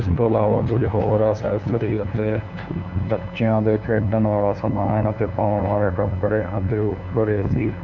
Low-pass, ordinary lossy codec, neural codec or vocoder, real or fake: 7.2 kHz; none; codec, 16 kHz, 2 kbps, FunCodec, trained on LibriTTS, 25 frames a second; fake